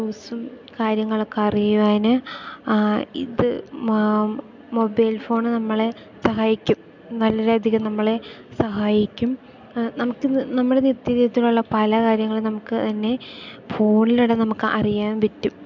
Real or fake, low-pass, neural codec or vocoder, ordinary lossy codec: real; 7.2 kHz; none; MP3, 64 kbps